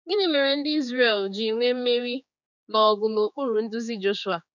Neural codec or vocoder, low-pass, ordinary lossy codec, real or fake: codec, 16 kHz, 4 kbps, X-Codec, HuBERT features, trained on general audio; 7.2 kHz; none; fake